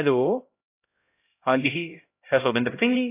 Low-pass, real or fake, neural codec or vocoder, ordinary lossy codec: 3.6 kHz; fake; codec, 16 kHz, 0.5 kbps, X-Codec, HuBERT features, trained on LibriSpeech; AAC, 24 kbps